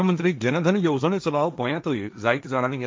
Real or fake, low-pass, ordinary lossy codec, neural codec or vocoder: fake; none; none; codec, 16 kHz, 1.1 kbps, Voila-Tokenizer